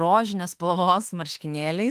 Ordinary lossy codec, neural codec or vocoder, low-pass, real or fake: Opus, 24 kbps; autoencoder, 48 kHz, 32 numbers a frame, DAC-VAE, trained on Japanese speech; 14.4 kHz; fake